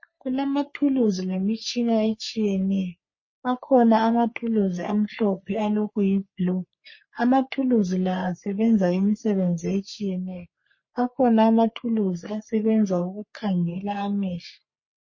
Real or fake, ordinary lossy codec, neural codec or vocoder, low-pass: fake; MP3, 32 kbps; codec, 44.1 kHz, 3.4 kbps, Pupu-Codec; 7.2 kHz